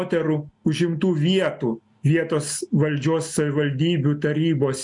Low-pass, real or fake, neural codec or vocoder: 10.8 kHz; real; none